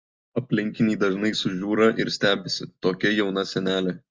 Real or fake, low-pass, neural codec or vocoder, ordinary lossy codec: real; 7.2 kHz; none; Opus, 32 kbps